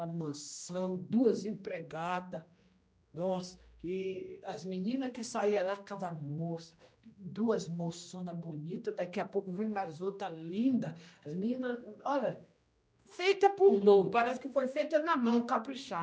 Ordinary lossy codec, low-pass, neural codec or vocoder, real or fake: none; none; codec, 16 kHz, 1 kbps, X-Codec, HuBERT features, trained on general audio; fake